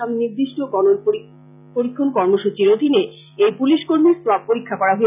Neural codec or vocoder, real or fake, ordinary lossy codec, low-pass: none; real; none; 3.6 kHz